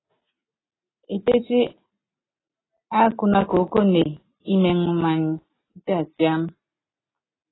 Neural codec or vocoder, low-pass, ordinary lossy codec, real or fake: none; 7.2 kHz; AAC, 16 kbps; real